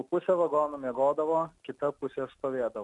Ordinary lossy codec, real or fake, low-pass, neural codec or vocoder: MP3, 96 kbps; real; 10.8 kHz; none